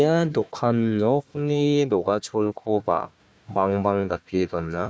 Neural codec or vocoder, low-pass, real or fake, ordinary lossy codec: codec, 16 kHz, 1 kbps, FunCodec, trained on Chinese and English, 50 frames a second; none; fake; none